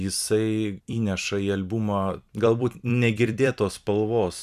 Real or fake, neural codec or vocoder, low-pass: fake; vocoder, 44.1 kHz, 128 mel bands every 256 samples, BigVGAN v2; 14.4 kHz